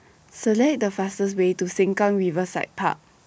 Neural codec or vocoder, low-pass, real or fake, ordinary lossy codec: none; none; real; none